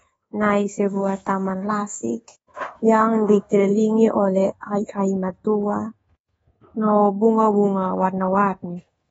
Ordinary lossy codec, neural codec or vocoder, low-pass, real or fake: AAC, 24 kbps; codec, 24 kHz, 1.2 kbps, DualCodec; 10.8 kHz; fake